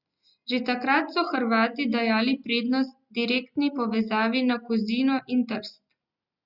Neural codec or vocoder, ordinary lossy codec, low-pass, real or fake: none; Opus, 64 kbps; 5.4 kHz; real